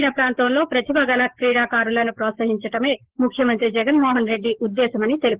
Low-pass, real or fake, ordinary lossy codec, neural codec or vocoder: 3.6 kHz; fake; Opus, 16 kbps; codec, 16 kHz, 6 kbps, DAC